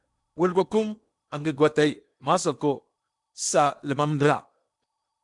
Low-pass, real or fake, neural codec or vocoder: 10.8 kHz; fake; codec, 16 kHz in and 24 kHz out, 0.8 kbps, FocalCodec, streaming, 65536 codes